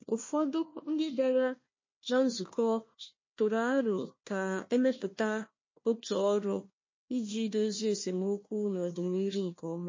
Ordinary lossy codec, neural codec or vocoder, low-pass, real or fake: MP3, 32 kbps; codec, 16 kHz, 1 kbps, FunCodec, trained on Chinese and English, 50 frames a second; 7.2 kHz; fake